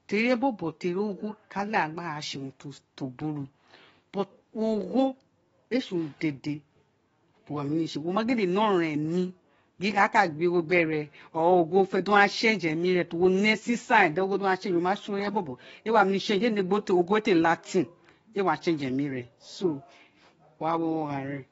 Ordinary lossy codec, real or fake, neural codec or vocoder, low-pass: AAC, 24 kbps; fake; codec, 44.1 kHz, 7.8 kbps, DAC; 19.8 kHz